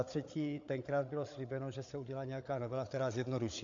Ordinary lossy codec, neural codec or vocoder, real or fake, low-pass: AAC, 48 kbps; codec, 16 kHz, 8 kbps, FreqCodec, larger model; fake; 7.2 kHz